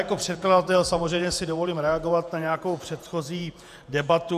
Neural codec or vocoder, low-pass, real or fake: vocoder, 48 kHz, 128 mel bands, Vocos; 14.4 kHz; fake